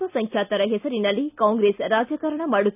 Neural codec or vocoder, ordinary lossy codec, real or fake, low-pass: none; none; real; 3.6 kHz